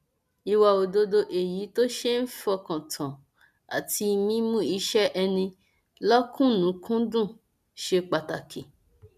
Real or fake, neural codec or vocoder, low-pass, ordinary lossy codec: real; none; 14.4 kHz; none